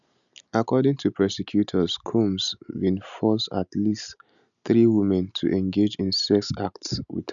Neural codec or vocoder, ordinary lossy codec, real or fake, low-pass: none; none; real; 7.2 kHz